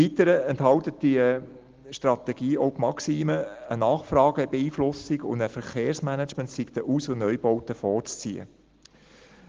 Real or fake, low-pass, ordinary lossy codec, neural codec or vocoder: real; 7.2 kHz; Opus, 16 kbps; none